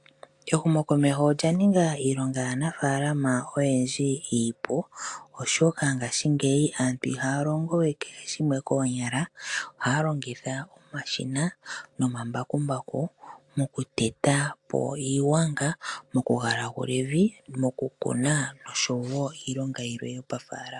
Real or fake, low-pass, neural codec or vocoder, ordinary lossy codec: real; 10.8 kHz; none; AAC, 64 kbps